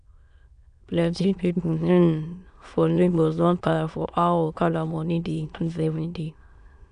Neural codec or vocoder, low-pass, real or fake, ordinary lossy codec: autoencoder, 22.05 kHz, a latent of 192 numbers a frame, VITS, trained on many speakers; 9.9 kHz; fake; none